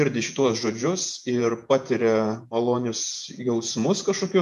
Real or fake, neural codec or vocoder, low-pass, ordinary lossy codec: fake; vocoder, 48 kHz, 128 mel bands, Vocos; 14.4 kHz; MP3, 96 kbps